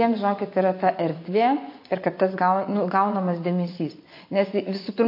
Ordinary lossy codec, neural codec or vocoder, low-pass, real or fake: MP3, 32 kbps; vocoder, 24 kHz, 100 mel bands, Vocos; 5.4 kHz; fake